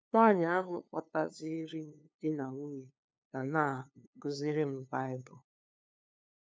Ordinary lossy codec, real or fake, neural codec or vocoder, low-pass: none; fake; codec, 16 kHz, 2 kbps, FunCodec, trained on LibriTTS, 25 frames a second; none